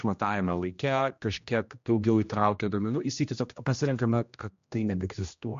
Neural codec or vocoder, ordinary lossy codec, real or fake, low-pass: codec, 16 kHz, 1 kbps, X-Codec, HuBERT features, trained on general audio; MP3, 48 kbps; fake; 7.2 kHz